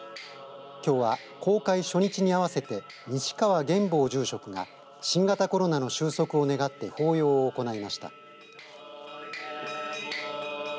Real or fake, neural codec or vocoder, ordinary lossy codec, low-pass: real; none; none; none